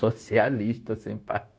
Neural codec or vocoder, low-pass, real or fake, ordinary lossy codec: codec, 16 kHz, 0.9 kbps, LongCat-Audio-Codec; none; fake; none